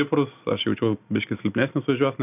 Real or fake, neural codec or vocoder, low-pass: real; none; 3.6 kHz